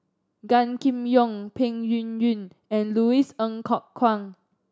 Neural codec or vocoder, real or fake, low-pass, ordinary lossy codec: none; real; none; none